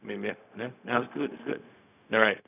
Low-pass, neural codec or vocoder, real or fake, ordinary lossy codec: 3.6 kHz; codec, 16 kHz, 0.4 kbps, LongCat-Audio-Codec; fake; none